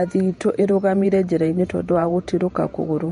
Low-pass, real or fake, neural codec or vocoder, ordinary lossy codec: 19.8 kHz; fake; vocoder, 48 kHz, 128 mel bands, Vocos; MP3, 48 kbps